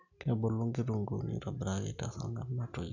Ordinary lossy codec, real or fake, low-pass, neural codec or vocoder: none; real; 7.2 kHz; none